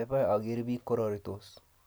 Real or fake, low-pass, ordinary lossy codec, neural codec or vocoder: real; none; none; none